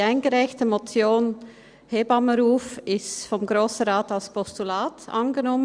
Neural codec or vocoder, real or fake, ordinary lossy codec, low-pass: none; real; Opus, 64 kbps; 9.9 kHz